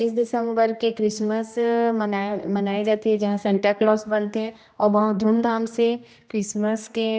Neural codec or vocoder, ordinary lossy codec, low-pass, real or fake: codec, 16 kHz, 1 kbps, X-Codec, HuBERT features, trained on general audio; none; none; fake